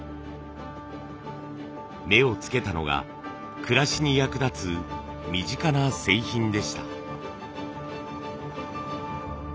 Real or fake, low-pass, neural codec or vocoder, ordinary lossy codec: real; none; none; none